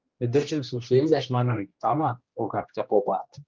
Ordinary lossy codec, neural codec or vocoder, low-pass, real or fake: Opus, 24 kbps; codec, 16 kHz, 1 kbps, X-Codec, HuBERT features, trained on general audio; 7.2 kHz; fake